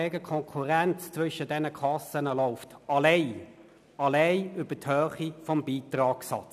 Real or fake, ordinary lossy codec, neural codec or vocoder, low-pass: real; none; none; 14.4 kHz